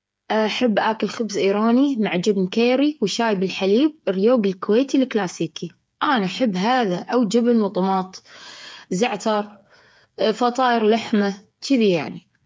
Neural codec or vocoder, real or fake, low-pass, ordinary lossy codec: codec, 16 kHz, 8 kbps, FreqCodec, smaller model; fake; none; none